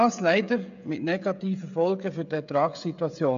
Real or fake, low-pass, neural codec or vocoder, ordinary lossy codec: fake; 7.2 kHz; codec, 16 kHz, 16 kbps, FreqCodec, smaller model; none